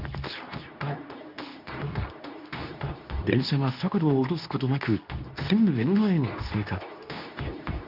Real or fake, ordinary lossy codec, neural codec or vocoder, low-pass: fake; none; codec, 24 kHz, 0.9 kbps, WavTokenizer, medium speech release version 2; 5.4 kHz